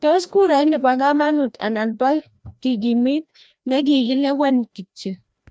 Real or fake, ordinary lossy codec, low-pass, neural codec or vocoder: fake; none; none; codec, 16 kHz, 1 kbps, FreqCodec, larger model